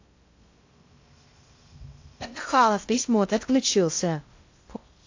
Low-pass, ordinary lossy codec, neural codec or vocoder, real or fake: 7.2 kHz; AAC, 48 kbps; codec, 16 kHz in and 24 kHz out, 0.6 kbps, FocalCodec, streaming, 2048 codes; fake